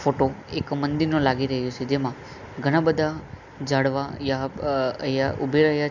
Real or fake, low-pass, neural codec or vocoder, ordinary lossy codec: real; 7.2 kHz; none; none